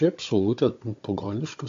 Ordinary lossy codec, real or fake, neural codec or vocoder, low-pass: AAC, 48 kbps; fake; codec, 16 kHz, 16 kbps, FunCodec, trained on Chinese and English, 50 frames a second; 7.2 kHz